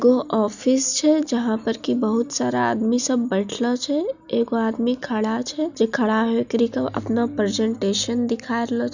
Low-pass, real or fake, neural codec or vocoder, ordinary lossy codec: 7.2 kHz; real; none; none